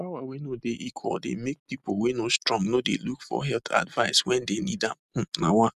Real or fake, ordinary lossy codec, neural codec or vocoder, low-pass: real; none; none; 14.4 kHz